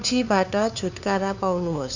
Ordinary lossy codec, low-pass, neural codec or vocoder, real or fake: none; 7.2 kHz; codec, 16 kHz, 2 kbps, FunCodec, trained on LibriTTS, 25 frames a second; fake